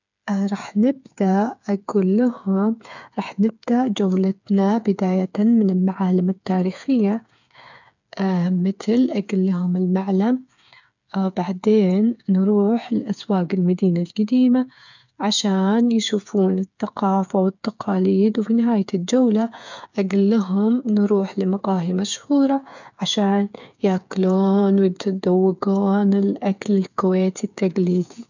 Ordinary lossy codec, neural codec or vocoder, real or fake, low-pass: none; codec, 16 kHz, 8 kbps, FreqCodec, smaller model; fake; 7.2 kHz